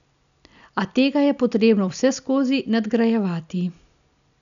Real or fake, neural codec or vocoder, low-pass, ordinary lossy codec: real; none; 7.2 kHz; none